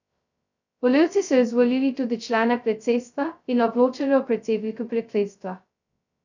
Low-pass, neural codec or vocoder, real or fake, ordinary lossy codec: 7.2 kHz; codec, 16 kHz, 0.2 kbps, FocalCodec; fake; none